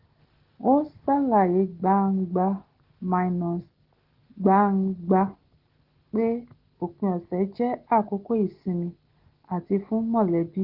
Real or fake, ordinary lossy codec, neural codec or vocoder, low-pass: real; Opus, 16 kbps; none; 5.4 kHz